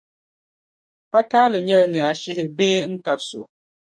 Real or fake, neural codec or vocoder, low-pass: fake; codec, 44.1 kHz, 2.6 kbps, DAC; 9.9 kHz